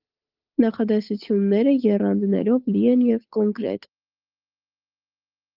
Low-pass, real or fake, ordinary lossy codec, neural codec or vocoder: 5.4 kHz; fake; Opus, 32 kbps; codec, 16 kHz, 8 kbps, FunCodec, trained on Chinese and English, 25 frames a second